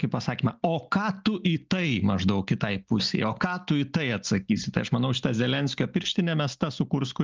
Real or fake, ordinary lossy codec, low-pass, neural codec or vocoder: real; Opus, 24 kbps; 7.2 kHz; none